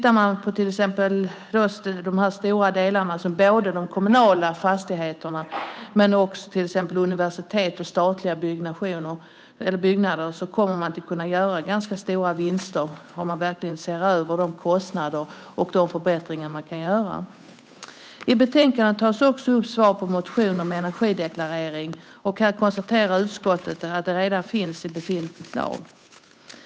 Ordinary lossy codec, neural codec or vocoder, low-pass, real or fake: none; codec, 16 kHz, 8 kbps, FunCodec, trained on Chinese and English, 25 frames a second; none; fake